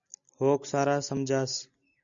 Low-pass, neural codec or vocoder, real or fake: 7.2 kHz; none; real